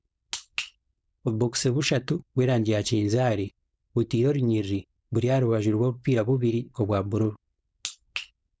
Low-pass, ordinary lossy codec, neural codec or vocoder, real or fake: none; none; codec, 16 kHz, 4.8 kbps, FACodec; fake